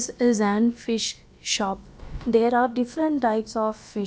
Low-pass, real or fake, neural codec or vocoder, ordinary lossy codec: none; fake; codec, 16 kHz, about 1 kbps, DyCAST, with the encoder's durations; none